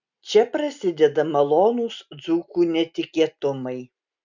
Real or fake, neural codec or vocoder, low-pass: real; none; 7.2 kHz